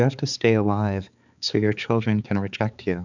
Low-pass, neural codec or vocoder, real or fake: 7.2 kHz; codec, 16 kHz, 4 kbps, X-Codec, HuBERT features, trained on balanced general audio; fake